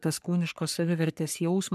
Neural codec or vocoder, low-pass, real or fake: codec, 44.1 kHz, 3.4 kbps, Pupu-Codec; 14.4 kHz; fake